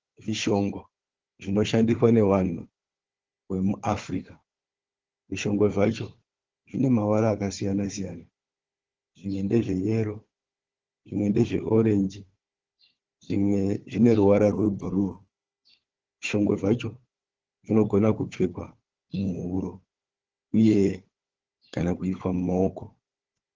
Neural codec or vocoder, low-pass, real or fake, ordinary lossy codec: codec, 16 kHz, 4 kbps, FunCodec, trained on Chinese and English, 50 frames a second; 7.2 kHz; fake; Opus, 16 kbps